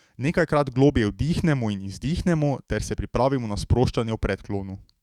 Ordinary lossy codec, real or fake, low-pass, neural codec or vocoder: Opus, 64 kbps; fake; 19.8 kHz; autoencoder, 48 kHz, 128 numbers a frame, DAC-VAE, trained on Japanese speech